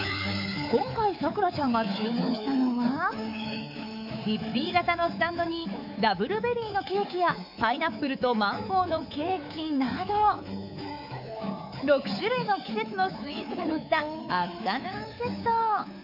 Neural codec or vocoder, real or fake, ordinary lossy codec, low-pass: codec, 24 kHz, 3.1 kbps, DualCodec; fake; none; 5.4 kHz